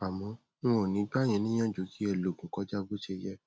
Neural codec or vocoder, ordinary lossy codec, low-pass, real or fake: none; none; none; real